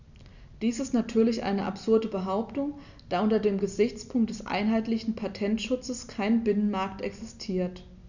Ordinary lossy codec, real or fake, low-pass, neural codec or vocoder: none; real; 7.2 kHz; none